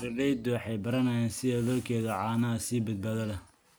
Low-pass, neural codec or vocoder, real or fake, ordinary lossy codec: none; none; real; none